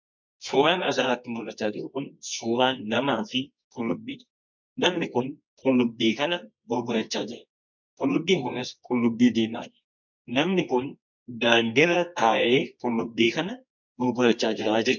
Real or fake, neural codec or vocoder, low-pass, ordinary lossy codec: fake; codec, 24 kHz, 0.9 kbps, WavTokenizer, medium music audio release; 7.2 kHz; MP3, 64 kbps